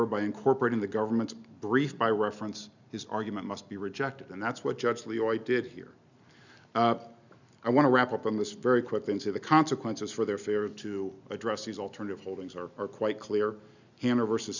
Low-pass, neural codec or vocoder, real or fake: 7.2 kHz; none; real